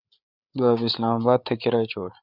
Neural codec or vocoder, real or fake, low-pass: codec, 16 kHz, 8 kbps, FreqCodec, larger model; fake; 5.4 kHz